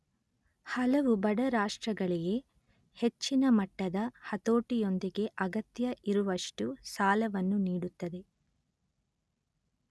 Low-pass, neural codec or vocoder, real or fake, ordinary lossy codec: none; none; real; none